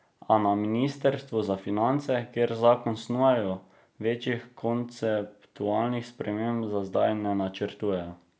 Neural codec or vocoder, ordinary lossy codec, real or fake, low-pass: none; none; real; none